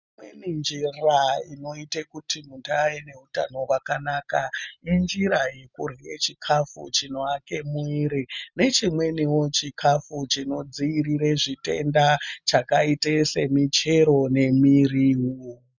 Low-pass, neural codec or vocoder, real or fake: 7.2 kHz; none; real